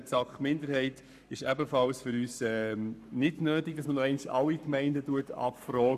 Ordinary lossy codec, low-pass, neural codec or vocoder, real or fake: none; 14.4 kHz; codec, 44.1 kHz, 7.8 kbps, Pupu-Codec; fake